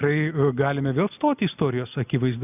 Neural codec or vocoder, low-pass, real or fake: none; 3.6 kHz; real